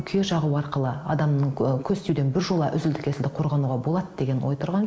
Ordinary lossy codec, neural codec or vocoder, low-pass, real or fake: none; none; none; real